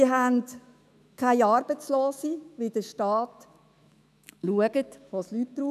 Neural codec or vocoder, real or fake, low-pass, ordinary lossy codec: autoencoder, 48 kHz, 128 numbers a frame, DAC-VAE, trained on Japanese speech; fake; 14.4 kHz; none